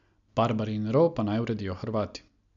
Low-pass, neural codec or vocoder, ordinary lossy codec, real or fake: 7.2 kHz; none; none; real